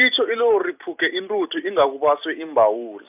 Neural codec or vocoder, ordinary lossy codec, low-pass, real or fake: none; none; 3.6 kHz; real